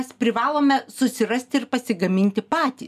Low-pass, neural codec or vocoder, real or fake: 14.4 kHz; none; real